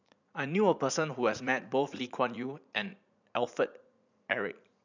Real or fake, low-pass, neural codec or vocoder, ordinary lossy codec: fake; 7.2 kHz; vocoder, 44.1 kHz, 80 mel bands, Vocos; none